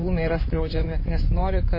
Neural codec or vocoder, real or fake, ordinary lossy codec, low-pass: codec, 16 kHz in and 24 kHz out, 2.2 kbps, FireRedTTS-2 codec; fake; MP3, 24 kbps; 5.4 kHz